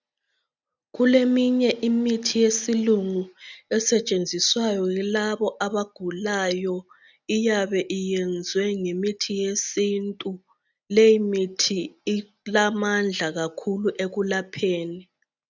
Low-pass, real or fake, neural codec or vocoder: 7.2 kHz; real; none